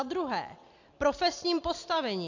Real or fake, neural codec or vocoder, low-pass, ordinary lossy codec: real; none; 7.2 kHz; AAC, 48 kbps